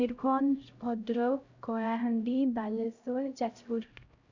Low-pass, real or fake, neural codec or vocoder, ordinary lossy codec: 7.2 kHz; fake; codec, 16 kHz, 0.5 kbps, X-Codec, HuBERT features, trained on LibriSpeech; Opus, 64 kbps